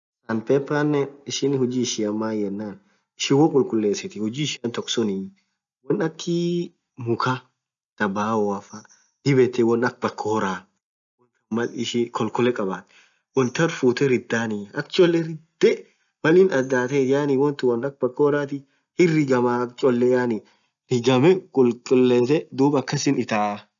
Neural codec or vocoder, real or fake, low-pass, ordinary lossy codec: none; real; 7.2 kHz; none